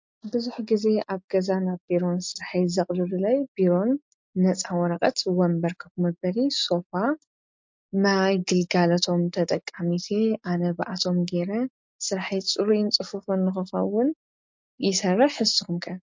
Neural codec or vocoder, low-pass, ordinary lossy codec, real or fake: none; 7.2 kHz; MP3, 48 kbps; real